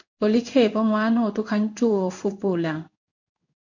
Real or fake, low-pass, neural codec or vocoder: fake; 7.2 kHz; codec, 16 kHz in and 24 kHz out, 1 kbps, XY-Tokenizer